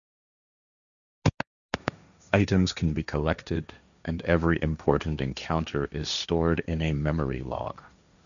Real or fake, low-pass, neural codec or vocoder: fake; 7.2 kHz; codec, 16 kHz, 1.1 kbps, Voila-Tokenizer